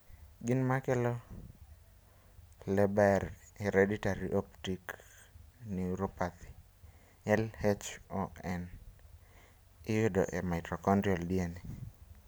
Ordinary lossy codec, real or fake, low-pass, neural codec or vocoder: none; real; none; none